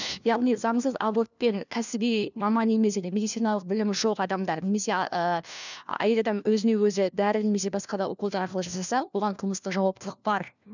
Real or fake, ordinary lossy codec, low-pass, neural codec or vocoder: fake; none; 7.2 kHz; codec, 16 kHz, 1 kbps, FunCodec, trained on LibriTTS, 50 frames a second